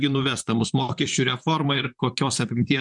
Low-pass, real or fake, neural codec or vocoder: 10.8 kHz; fake; vocoder, 44.1 kHz, 128 mel bands, Pupu-Vocoder